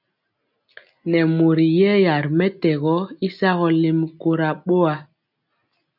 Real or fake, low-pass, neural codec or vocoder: real; 5.4 kHz; none